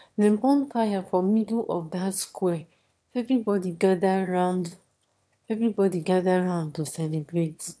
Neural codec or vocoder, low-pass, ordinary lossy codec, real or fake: autoencoder, 22.05 kHz, a latent of 192 numbers a frame, VITS, trained on one speaker; none; none; fake